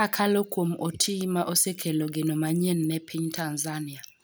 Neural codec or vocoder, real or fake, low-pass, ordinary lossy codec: none; real; none; none